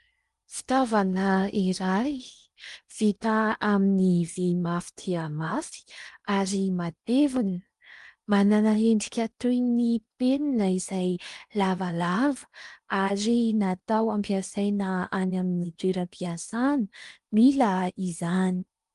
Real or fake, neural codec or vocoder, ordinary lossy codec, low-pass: fake; codec, 16 kHz in and 24 kHz out, 0.8 kbps, FocalCodec, streaming, 65536 codes; Opus, 32 kbps; 10.8 kHz